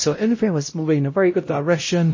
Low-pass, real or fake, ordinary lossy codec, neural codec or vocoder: 7.2 kHz; fake; MP3, 32 kbps; codec, 16 kHz, 0.5 kbps, X-Codec, WavLM features, trained on Multilingual LibriSpeech